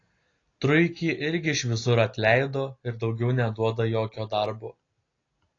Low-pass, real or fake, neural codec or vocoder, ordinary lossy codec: 7.2 kHz; real; none; AAC, 32 kbps